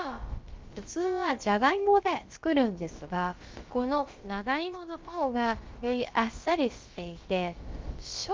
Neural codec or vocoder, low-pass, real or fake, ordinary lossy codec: codec, 16 kHz, about 1 kbps, DyCAST, with the encoder's durations; 7.2 kHz; fake; Opus, 32 kbps